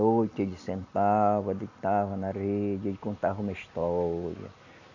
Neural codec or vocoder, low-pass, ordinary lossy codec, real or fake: none; 7.2 kHz; none; real